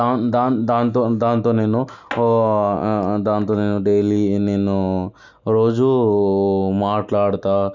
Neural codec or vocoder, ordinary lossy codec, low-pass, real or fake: none; none; 7.2 kHz; real